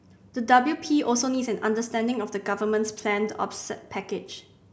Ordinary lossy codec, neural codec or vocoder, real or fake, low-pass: none; none; real; none